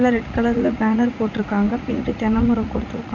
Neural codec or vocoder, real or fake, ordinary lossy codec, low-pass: vocoder, 44.1 kHz, 80 mel bands, Vocos; fake; none; 7.2 kHz